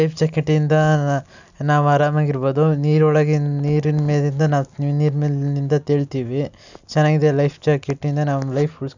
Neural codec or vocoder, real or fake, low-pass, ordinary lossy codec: none; real; 7.2 kHz; none